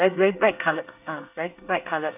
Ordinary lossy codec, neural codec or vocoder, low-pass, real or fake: none; codec, 24 kHz, 1 kbps, SNAC; 3.6 kHz; fake